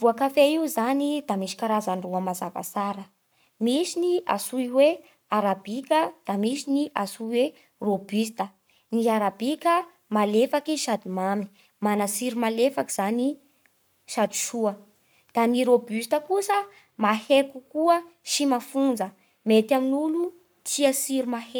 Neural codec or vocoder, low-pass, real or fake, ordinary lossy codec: codec, 44.1 kHz, 7.8 kbps, Pupu-Codec; none; fake; none